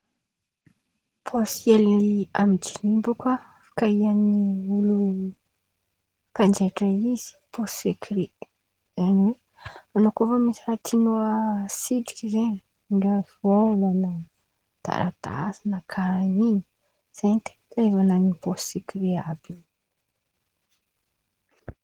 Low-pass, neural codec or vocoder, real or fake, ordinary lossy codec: 19.8 kHz; none; real; Opus, 16 kbps